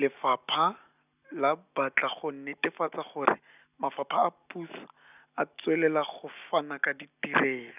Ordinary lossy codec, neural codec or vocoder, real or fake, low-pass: none; none; real; 3.6 kHz